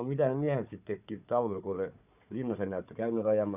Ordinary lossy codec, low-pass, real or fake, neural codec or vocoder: none; 3.6 kHz; fake; codec, 16 kHz, 4 kbps, FunCodec, trained on Chinese and English, 50 frames a second